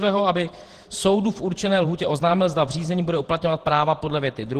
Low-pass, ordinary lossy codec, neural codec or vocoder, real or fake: 14.4 kHz; Opus, 16 kbps; vocoder, 48 kHz, 128 mel bands, Vocos; fake